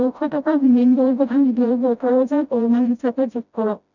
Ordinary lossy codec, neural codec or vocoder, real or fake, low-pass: none; codec, 16 kHz, 0.5 kbps, FreqCodec, smaller model; fake; 7.2 kHz